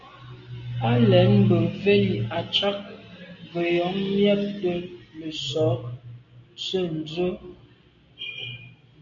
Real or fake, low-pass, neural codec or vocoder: real; 7.2 kHz; none